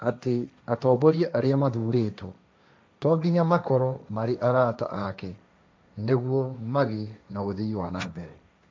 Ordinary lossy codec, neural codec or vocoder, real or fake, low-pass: none; codec, 16 kHz, 1.1 kbps, Voila-Tokenizer; fake; none